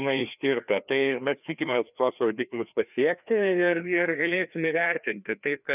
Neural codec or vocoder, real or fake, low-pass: codec, 16 kHz, 2 kbps, FreqCodec, larger model; fake; 3.6 kHz